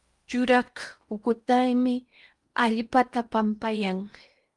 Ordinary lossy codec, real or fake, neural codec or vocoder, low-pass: Opus, 32 kbps; fake; codec, 16 kHz in and 24 kHz out, 0.8 kbps, FocalCodec, streaming, 65536 codes; 10.8 kHz